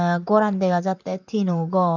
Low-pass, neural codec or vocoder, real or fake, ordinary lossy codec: 7.2 kHz; vocoder, 44.1 kHz, 128 mel bands, Pupu-Vocoder; fake; none